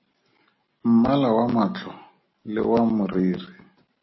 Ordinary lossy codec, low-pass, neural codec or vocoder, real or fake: MP3, 24 kbps; 7.2 kHz; none; real